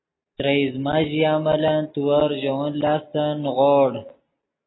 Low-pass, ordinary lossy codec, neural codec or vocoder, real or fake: 7.2 kHz; AAC, 16 kbps; none; real